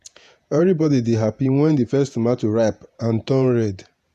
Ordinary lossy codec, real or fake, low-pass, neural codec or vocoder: none; fake; 14.4 kHz; vocoder, 48 kHz, 128 mel bands, Vocos